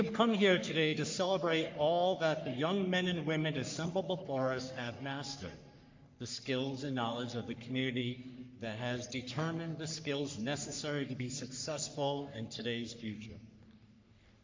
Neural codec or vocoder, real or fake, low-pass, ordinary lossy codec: codec, 44.1 kHz, 3.4 kbps, Pupu-Codec; fake; 7.2 kHz; MP3, 48 kbps